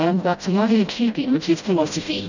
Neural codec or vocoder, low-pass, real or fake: codec, 16 kHz, 0.5 kbps, FreqCodec, smaller model; 7.2 kHz; fake